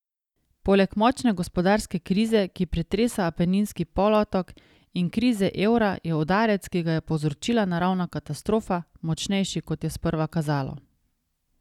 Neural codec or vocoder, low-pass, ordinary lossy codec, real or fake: none; 19.8 kHz; none; real